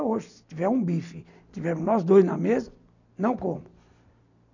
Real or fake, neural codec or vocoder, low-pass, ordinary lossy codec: real; none; 7.2 kHz; none